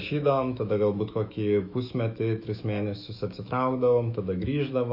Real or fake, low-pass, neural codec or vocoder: fake; 5.4 kHz; autoencoder, 48 kHz, 128 numbers a frame, DAC-VAE, trained on Japanese speech